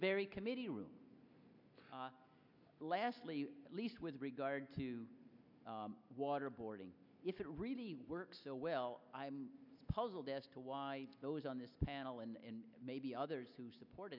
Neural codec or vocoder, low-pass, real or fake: none; 5.4 kHz; real